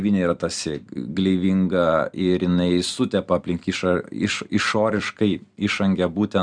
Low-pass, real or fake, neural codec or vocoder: 9.9 kHz; fake; vocoder, 44.1 kHz, 128 mel bands every 256 samples, BigVGAN v2